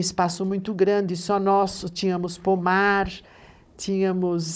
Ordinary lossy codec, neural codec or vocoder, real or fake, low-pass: none; codec, 16 kHz, 16 kbps, FunCodec, trained on Chinese and English, 50 frames a second; fake; none